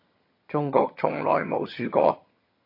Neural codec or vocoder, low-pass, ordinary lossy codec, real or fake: vocoder, 22.05 kHz, 80 mel bands, HiFi-GAN; 5.4 kHz; MP3, 32 kbps; fake